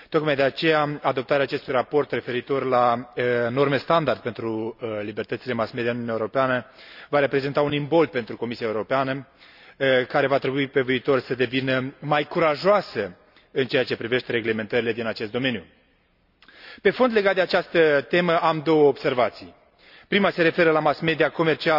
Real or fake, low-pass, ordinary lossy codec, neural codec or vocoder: real; 5.4 kHz; none; none